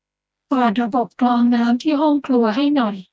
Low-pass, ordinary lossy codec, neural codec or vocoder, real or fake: none; none; codec, 16 kHz, 1 kbps, FreqCodec, smaller model; fake